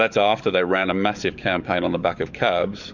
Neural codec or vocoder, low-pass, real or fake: codec, 44.1 kHz, 7.8 kbps, DAC; 7.2 kHz; fake